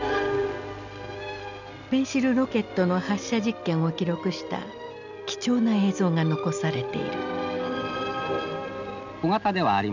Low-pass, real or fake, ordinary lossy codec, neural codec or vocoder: 7.2 kHz; real; none; none